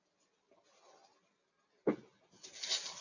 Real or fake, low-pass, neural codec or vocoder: real; 7.2 kHz; none